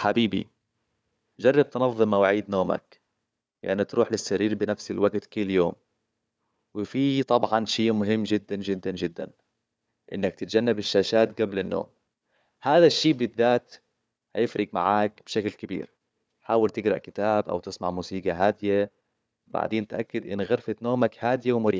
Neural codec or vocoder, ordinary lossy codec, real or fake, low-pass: codec, 16 kHz, 4 kbps, FunCodec, trained on Chinese and English, 50 frames a second; none; fake; none